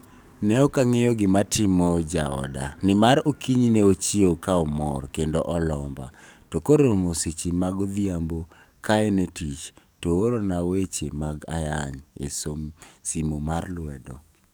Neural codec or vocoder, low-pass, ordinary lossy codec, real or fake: codec, 44.1 kHz, 7.8 kbps, DAC; none; none; fake